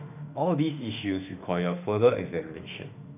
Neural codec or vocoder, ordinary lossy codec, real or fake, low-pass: autoencoder, 48 kHz, 32 numbers a frame, DAC-VAE, trained on Japanese speech; none; fake; 3.6 kHz